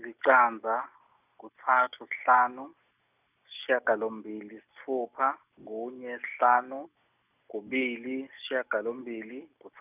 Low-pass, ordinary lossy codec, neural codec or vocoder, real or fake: 3.6 kHz; none; none; real